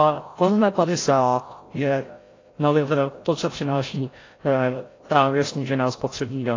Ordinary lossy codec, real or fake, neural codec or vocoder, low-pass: AAC, 32 kbps; fake; codec, 16 kHz, 0.5 kbps, FreqCodec, larger model; 7.2 kHz